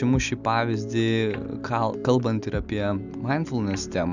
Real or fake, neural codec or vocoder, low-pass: real; none; 7.2 kHz